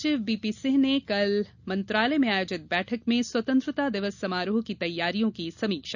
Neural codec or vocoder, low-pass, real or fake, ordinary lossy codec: none; 7.2 kHz; real; none